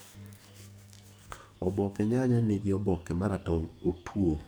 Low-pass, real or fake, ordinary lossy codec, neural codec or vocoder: none; fake; none; codec, 44.1 kHz, 2.6 kbps, SNAC